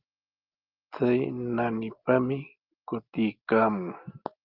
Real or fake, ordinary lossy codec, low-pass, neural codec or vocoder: real; Opus, 32 kbps; 5.4 kHz; none